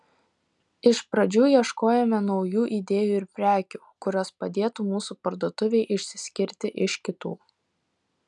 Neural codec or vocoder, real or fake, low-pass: none; real; 10.8 kHz